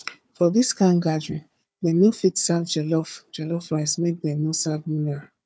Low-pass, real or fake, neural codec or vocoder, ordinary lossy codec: none; fake; codec, 16 kHz, 4 kbps, FunCodec, trained on Chinese and English, 50 frames a second; none